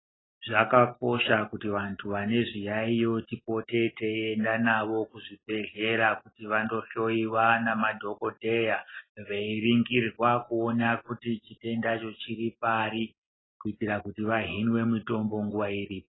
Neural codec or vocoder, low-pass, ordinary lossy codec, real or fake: none; 7.2 kHz; AAC, 16 kbps; real